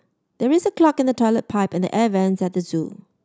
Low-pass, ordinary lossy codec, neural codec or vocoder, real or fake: none; none; none; real